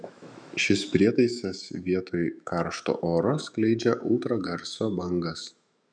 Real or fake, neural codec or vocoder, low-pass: fake; autoencoder, 48 kHz, 128 numbers a frame, DAC-VAE, trained on Japanese speech; 9.9 kHz